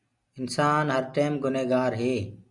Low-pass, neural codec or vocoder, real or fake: 10.8 kHz; none; real